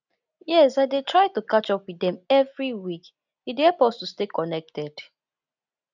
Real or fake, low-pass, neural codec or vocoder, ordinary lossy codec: real; 7.2 kHz; none; none